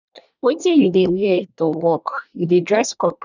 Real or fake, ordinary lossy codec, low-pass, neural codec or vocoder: fake; none; 7.2 kHz; codec, 24 kHz, 1 kbps, SNAC